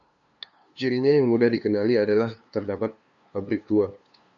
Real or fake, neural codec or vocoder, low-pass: fake; codec, 16 kHz, 2 kbps, FunCodec, trained on LibriTTS, 25 frames a second; 7.2 kHz